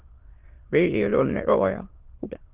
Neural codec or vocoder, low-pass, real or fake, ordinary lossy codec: autoencoder, 22.05 kHz, a latent of 192 numbers a frame, VITS, trained on many speakers; 3.6 kHz; fake; Opus, 16 kbps